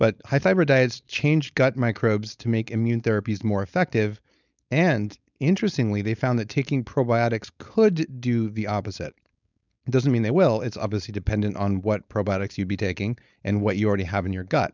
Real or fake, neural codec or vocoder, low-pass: fake; codec, 16 kHz, 4.8 kbps, FACodec; 7.2 kHz